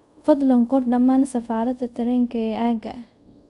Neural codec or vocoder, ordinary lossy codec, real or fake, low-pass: codec, 24 kHz, 0.5 kbps, DualCodec; none; fake; 10.8 kHz